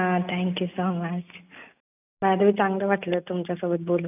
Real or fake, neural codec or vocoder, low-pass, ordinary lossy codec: real; none; 3.6 kHz; none